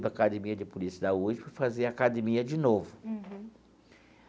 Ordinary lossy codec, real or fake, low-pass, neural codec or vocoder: none; real; none; none